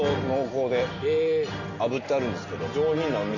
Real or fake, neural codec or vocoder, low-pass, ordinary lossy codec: real; none; 7.2 kHz; none